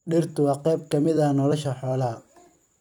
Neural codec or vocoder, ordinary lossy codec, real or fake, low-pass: vocoder, 44.1 kHz, 128 mel bands every 256 samples, BigVGAN v2; none; fake; 19.8 kHz